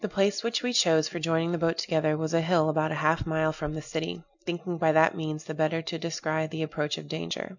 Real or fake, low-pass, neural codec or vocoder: real; 7.2 kHz; none